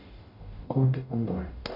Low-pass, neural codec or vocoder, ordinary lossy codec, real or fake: 5.4 kHz; codec, 44.1 kHz, 0.9 kbps, DAC; AAC, 32 kbps; fake